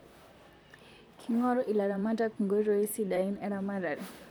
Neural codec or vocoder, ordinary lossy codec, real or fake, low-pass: vocoder, 44.1 kHz, 128 mel bands, Pupu-Vocoder; none; fake; none